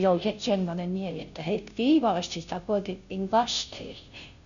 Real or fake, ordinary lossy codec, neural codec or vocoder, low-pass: fake; none; codec, 16 kHz, 0.5 kbps, FunCodec, trained on Chinese and English, 25 frames a second; 7.2 kHz